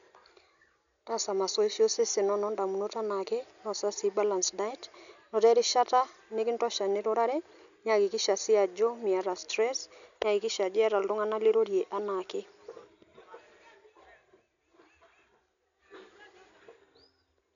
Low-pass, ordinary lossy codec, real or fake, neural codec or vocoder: 7.2 kHz; none; real; none